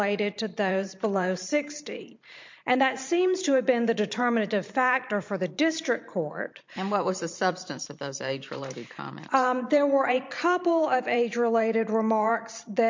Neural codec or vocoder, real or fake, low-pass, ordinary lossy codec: vocoder, 44.1 kHz, 128 mel bands every 512 samples, BigVGAN v2; fake; 7.2 kHz; MP3, 48 kbps